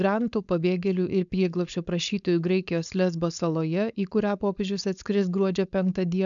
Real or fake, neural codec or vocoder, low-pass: fake; codec, 16 kHz, 4.8 kbps, FACodec; 7.2 kHz